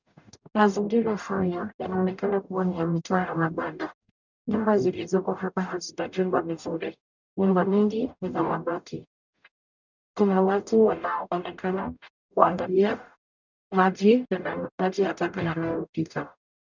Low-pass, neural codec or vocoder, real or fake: 7.2 kHz; codec, 44.1 kHz, 0.9 kbps, DAC; fake